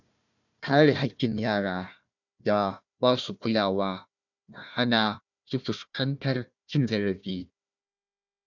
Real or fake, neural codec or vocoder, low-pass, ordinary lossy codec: fake; codec, 16 kHz, 1 kbps, FunCodec, trained on Chinese and English, 50 frames a second; 7.2 kHz; none